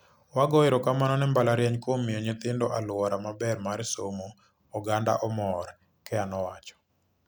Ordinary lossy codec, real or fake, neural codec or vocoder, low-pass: none; real; none; none